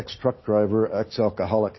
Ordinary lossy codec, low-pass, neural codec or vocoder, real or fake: MP3, 24 kbps; 7.2 kHz; none; real